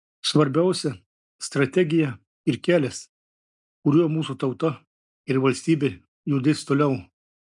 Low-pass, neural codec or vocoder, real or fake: 10.8 kHz; none; real